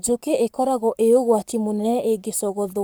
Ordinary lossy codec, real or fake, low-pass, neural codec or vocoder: none; fake; none; codec, 44.1 kHz, 7.8 kbps, Pupu-Codec